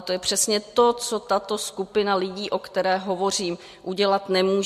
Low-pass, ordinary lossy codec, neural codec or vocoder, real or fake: 14.4 kHz; MP3, 64 kbps; none; real